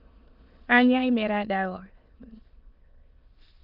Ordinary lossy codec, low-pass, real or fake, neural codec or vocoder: Opus, 32 kbps; 5.4 kHz; fake; autoencoder, 22.05 kHz, a latent of 192 numbers a frame, VITS, trained on many speakers